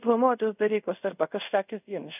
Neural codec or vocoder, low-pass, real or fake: codec, 24 kHz, 0.5 kbps, DualCodec; 3.6 kHz; fake